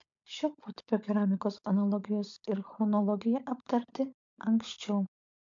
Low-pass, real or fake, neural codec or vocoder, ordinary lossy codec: 7.2 kHz; fake; codec, 16 kHz, 16 kbps, FunCodec, trained on LibriTTS, 50 frames a second; MP3, 64 kbps